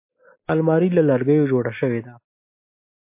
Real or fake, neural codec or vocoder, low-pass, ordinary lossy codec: real; none; 3.6 kHz; MP3, 24 kbps